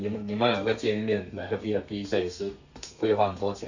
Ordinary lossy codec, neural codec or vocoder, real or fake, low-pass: Opus, 64 kbps; codec, 32 kHz, 1.9 kbps, SNAC; fake; 7.2 kHz